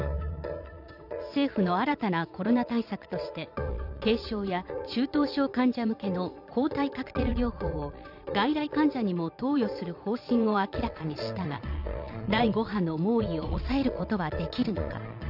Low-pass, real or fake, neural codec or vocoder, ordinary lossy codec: 5.4 kHz; fake; vocoder, 22.05 kHz, 80 mel bands, Vocos; none